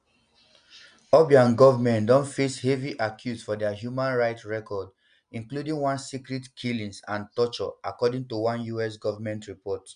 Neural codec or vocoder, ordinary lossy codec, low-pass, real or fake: none; none; 9.9 kHz; real